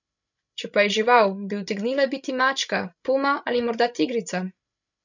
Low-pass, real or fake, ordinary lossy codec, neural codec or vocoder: 7.2 kHz; fake; none; codec, 16 kHz, 16 kbps, FreqCodec, larger model